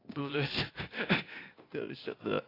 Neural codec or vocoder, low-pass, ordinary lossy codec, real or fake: codec, 16 kHz, 0.8 kbps, ZipCodec; 5.4 kHz; AAC, 24 kbps; fake